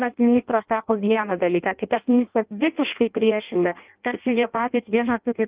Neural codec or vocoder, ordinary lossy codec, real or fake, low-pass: codec, 16 kHz in and 24 kHz out, 0.6 kbps, FireRedTTS-2 codec; Opus, 24 kbps; fake; 3.6 kHz